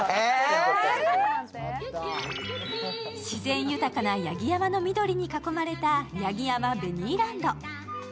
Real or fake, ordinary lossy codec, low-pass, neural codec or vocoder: real; none; none; none